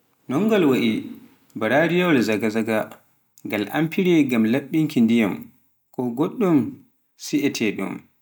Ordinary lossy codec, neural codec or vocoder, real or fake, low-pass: none; none; real; none